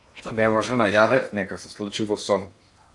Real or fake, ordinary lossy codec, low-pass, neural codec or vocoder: fake; AAC, 64 kbps; 10.8 kHz; codec, 16 kHz in and 24 kHz out, 0.8 kbps, FocalCodec, streaming, 65536 codes